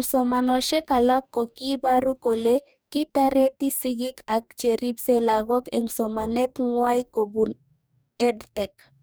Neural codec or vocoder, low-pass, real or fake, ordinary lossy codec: codec, 44.1 kHz, 2.6 kbps, DAC; none; fake; none